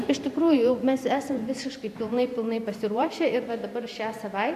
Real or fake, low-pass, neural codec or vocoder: fake; 14.4 kHz; vocoder, 44.1 kHz, 128 mel bands every 512 samples, BigVGAN v2